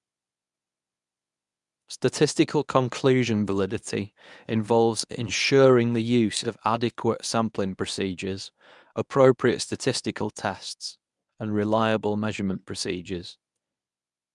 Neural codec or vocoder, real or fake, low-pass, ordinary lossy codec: codec, 24 kHz, 0.9 kbps, WavTokenizer, medium speech release version 1; fake; 10.8 kHz; none